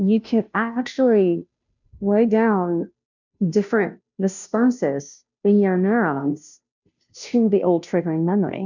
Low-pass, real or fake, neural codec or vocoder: 7.2 kHz; fake; codec, 16 kHz, 0.5 kbps, FunCodec, trained on Chinese and English, 25 frames a second